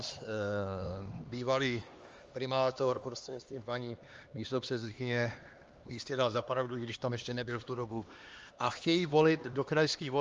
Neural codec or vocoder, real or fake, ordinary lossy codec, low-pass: codec, 16 kHz, 2 kbps, X-Codec, HuBERT features, trained on LibriSpeech; fake; Opus, 32 kbps; 7.2 kHz